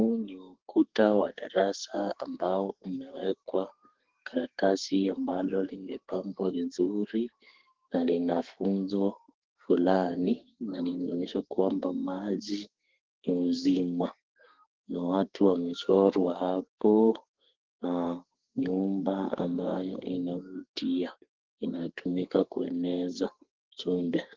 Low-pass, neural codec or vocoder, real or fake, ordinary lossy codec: 7.2 kHz; codec, 32 kHz, 1.9 kbps, SNAC; fake; Opus, 16 kbps